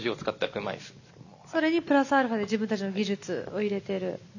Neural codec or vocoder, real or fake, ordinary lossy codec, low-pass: none; real; none; 7.2 kHz